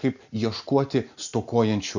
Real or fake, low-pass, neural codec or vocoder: real; 7.2 kHz; none